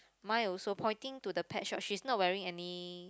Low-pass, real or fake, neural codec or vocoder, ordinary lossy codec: none; real; none; none